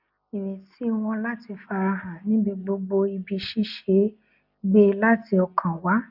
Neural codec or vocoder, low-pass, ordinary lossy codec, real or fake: none; 5.4 kHz; none; real